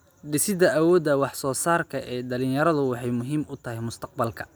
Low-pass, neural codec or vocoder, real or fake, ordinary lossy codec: none; none; real; none